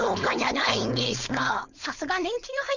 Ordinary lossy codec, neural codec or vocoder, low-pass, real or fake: none; codec, 16 kHz, 4.8 kbps, FACodec; 7.2 kHz; fake